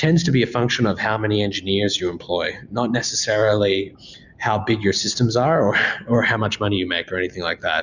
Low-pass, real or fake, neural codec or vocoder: 7.2 kHz; real; none